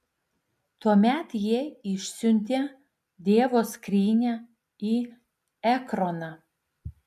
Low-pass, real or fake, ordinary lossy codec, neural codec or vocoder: 14.4 kHz; real; MP3, 96 kbps; none